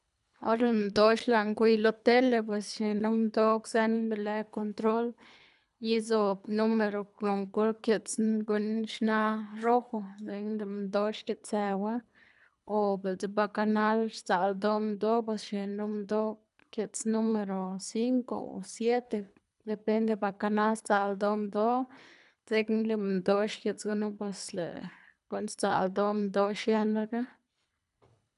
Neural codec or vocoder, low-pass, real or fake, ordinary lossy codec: codec, 24 kHz, 3 kbps, HILCodec; 10.8 kHz; fake; none